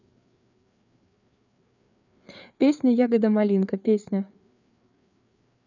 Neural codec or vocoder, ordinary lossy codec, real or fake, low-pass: codec, 16 kHz, 4 kbps, FreqCodec, larger model; none; fake; 7.2 kHz